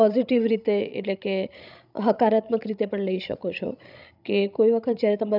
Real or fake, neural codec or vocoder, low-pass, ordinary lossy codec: fake; codec, 16 kHz, 16 kbps, FreqCodec, larger model; 5.4 kHz; none